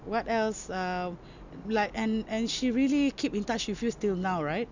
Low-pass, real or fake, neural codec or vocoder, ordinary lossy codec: 7.2 kHz; real; none; none